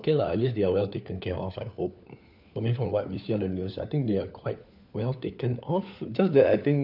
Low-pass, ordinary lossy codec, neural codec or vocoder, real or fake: 5.4 kHz; none; codec, 16 kHz, 4 kbps, FunCodec, trained on LibriTTS, 50 frames a second; fake